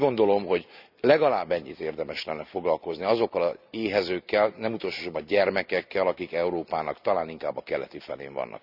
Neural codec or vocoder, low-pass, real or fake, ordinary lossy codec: none; 5.4 kHz; real; none